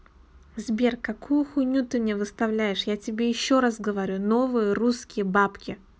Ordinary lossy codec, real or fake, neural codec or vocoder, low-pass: none; real; none; none